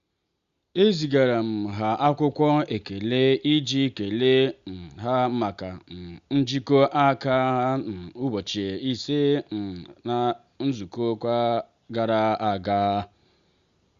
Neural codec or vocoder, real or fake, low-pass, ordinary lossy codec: none; real; 7.2 kHz; Opus, 64 kbps